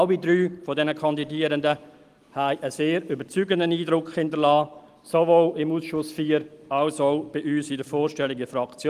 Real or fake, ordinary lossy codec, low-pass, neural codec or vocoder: real; Opus, 24 kbps; 14.4 kHz; none